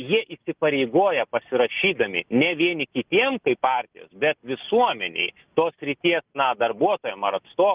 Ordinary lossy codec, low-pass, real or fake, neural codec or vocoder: Opus, 32 kbps; 3.6 kHz; real; none